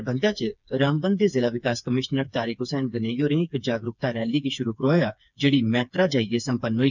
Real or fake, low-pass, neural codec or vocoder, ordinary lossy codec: fake; 7.2 kHz; codec, 16 kHz, 4 kbps, FreqCodec, smaller model; none